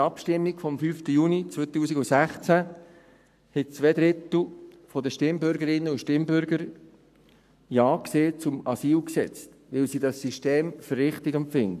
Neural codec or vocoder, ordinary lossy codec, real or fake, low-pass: codec, 44.1 kHz, 7.8 kbps, Pupu-Codec; none; fake; 14.4 kHz